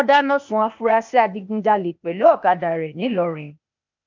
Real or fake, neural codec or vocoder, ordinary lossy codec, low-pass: fake; codec, 16 kHz, 0.8 kbps, ZipCodec; MP3, 64 kbps; 7.2 kHz